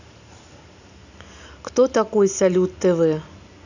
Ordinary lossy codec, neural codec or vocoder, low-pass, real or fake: none; none; 7.2 kHz; real